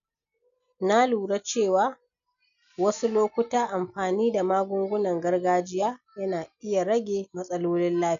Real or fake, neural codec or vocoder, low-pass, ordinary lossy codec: real; none; 7.2 kHz; none